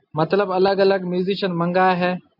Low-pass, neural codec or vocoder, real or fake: 5.4 kHz; none; real